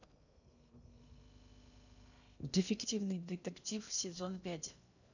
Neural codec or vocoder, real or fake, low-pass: codec, 16 kHz in and 24 kHz out, 0.8 kbps, FocalCodec, streaming, 65536 codes; fake; 7.2 kHz